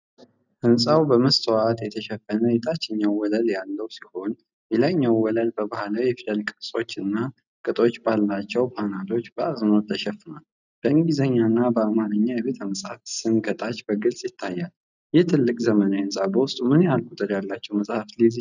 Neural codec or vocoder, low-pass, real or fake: none; 7.2 kHz; real